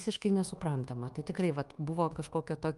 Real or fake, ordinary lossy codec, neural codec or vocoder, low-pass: fake; Opus, 32 kbps; codec, 24 kHz, 1.2 kbps, DualCodec; 10.8 kHz